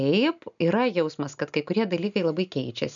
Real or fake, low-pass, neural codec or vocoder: real; 7.2 kHz; none